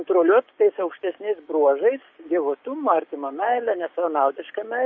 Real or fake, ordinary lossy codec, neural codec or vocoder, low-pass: fake; MP3, 32 kbps; codec, 16 kHz, 6 kbps, DAC; 7.2 kHz